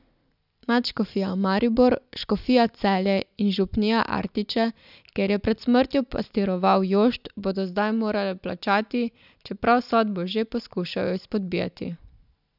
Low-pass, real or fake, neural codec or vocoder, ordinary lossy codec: 5.4 kHz; real; none; none